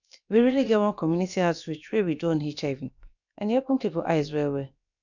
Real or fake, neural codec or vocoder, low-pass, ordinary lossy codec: fake; codec, 16 kHz, about 1 kbps, DyCAST, with the encoder's durations; 7.2 kHz; none